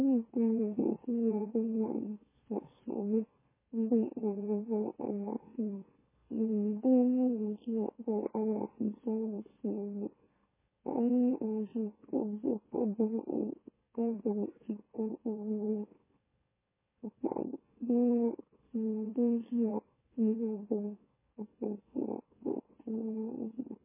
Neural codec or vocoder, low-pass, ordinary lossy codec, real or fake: autoencoder, 44.1 kHz, a latent of 192 numbers a frame, MeloTTS; 3.6 kHz; MP3, 16 kbps; fake